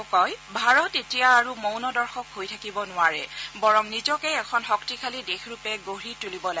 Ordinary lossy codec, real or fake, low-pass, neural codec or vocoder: none; real; none; none